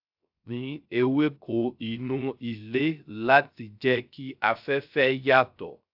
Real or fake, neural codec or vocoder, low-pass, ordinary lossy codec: fake; codec, 16 kHz, 0.3 kbps, FocalCodec; 5.4 kHz; AAC, 48 kbps